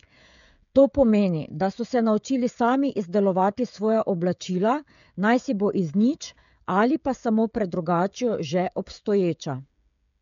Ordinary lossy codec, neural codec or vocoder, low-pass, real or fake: none; codec, 16 kHz, 16 kbps, FreqCodec, smaller model; 7.2 kHz; fake